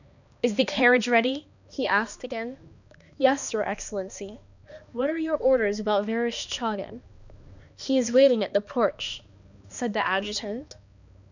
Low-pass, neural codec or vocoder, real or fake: 7.2 kHz; codec, 16 kHz, 2 kbps, X-Codec, HuBERT features, trained on balanced general audio; fake